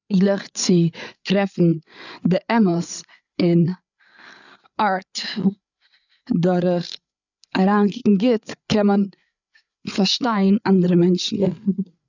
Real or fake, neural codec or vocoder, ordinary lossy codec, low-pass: fake; codec, 16 kHz, 8 kbps, FreqCodec, larger model; none; 7.2 kHz